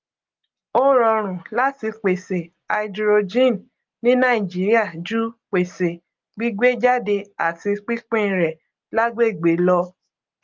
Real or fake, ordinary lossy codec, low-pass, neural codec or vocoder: real; Opus, 24 kbps; 7.2 kHz; none